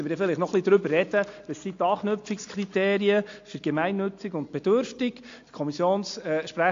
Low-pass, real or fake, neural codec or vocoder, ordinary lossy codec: 7.2 kHz; real; none; AAC, 48 kbps